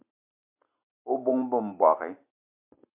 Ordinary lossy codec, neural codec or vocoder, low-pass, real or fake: MP3, 32 kbps; none; 3.6 kHz; real